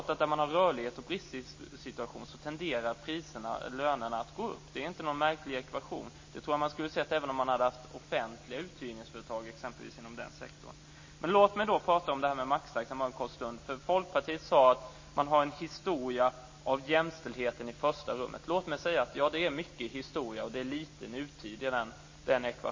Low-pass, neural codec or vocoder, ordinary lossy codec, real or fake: 7.2 kHz; none; MP3, 32 kbps; real